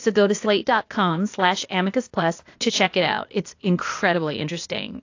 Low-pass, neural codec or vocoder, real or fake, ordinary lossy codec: 7.2 kHz; codec, 16 kHz, 0.8 kbps, ZipCodec; fake; AAC, 48 kbps